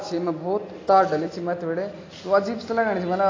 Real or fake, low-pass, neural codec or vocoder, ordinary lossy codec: real; 7.2 kHz; none; AAC, 32 kbps